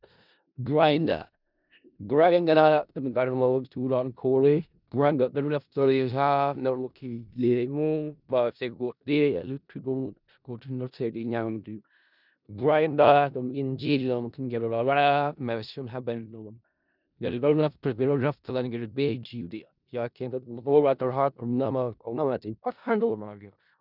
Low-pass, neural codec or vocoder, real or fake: 5.4 kHz; codec, 16 kHz in and 24 kHz out, 0.4 kbps, LongCat-Audio-Codec, four codebook decoder; fake